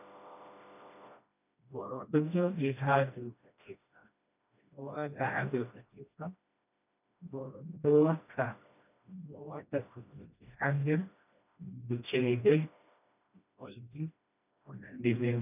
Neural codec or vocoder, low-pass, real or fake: codec, 16 kHz, 1 kbps, FreqCodec, smaller model; 3.6 kHz; fake